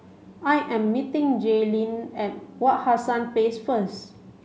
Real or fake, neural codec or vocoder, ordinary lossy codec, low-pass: real; none; none; none